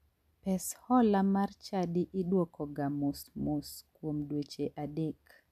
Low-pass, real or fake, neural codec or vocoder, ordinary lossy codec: 14.4 kHz; real; none; none